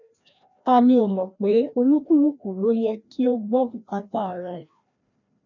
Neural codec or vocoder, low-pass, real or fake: codec, 16 kHz, 1 kbps, FreqCodec, larger model; 7.2 kHz; fake